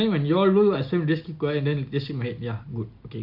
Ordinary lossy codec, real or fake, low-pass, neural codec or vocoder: MP3, 48 kbps; real; 5.4 kHz; none